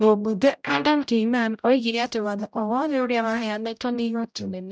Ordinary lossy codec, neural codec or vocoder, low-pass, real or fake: none; codec, 16 kHz, 0.5 kbps, X-Codec, HuBERT features, trained on general audio; none; fake